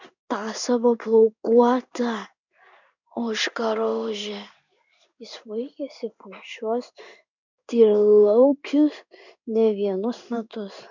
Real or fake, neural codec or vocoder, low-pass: fake; codec, 16 kHz in and 24 kHz out, 1 kbps, XY-Tokenizer; 7.2 kHz